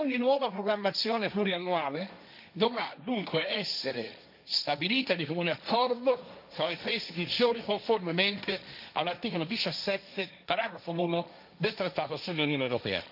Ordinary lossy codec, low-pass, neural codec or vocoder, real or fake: none; 5.4 kHz; codec, 16 kHz, 1.1 kbps, Voila-Tokenizer; fake